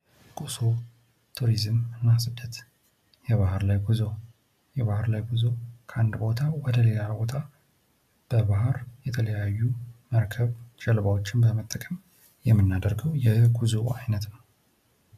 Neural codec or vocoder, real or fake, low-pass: none; real; 14.4 kHz